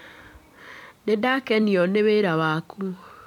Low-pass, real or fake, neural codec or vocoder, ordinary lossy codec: 19.8 kHz; fake; vocoder, 44.1 kHz, 128 mel bands every 256 samples, BigVGAN v2; none